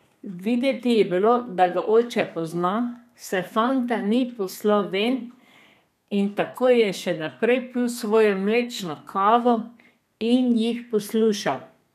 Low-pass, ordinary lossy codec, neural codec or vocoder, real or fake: 14.4 kHz; none; codec, 32 kHz, 1.9 kbps, SNAC; fake